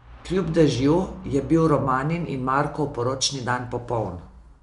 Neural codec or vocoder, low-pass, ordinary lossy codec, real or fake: none; 10.8 kHz; none; real